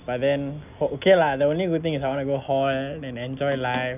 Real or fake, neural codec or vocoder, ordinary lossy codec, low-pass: real; none; none; 3.6 kHz